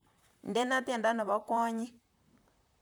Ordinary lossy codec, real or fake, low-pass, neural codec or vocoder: none; fake; none; vocoder, 44.1 kHz, 128 mel bands, Pupu-Vocoder